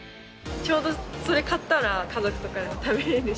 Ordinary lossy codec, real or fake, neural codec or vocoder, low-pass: none; real; none; none